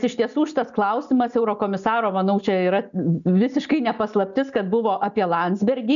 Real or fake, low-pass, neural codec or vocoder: real; 7.2 kHz; none